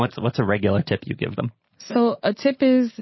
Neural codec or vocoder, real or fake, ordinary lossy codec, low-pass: none; real; MP3, 24 kbps; 7.2 kHz